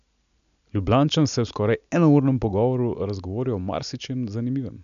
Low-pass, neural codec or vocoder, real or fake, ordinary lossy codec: 7.2 kHz; none; real; none